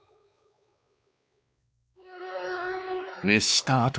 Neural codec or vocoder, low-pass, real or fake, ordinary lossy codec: codec, 16 kHz, 2 kbps, X-Codec, WavLM features, trained on Multilingual LibriSpeech; none; fake; none